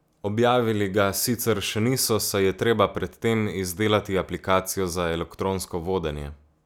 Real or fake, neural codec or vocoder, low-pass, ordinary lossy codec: real; none; none; none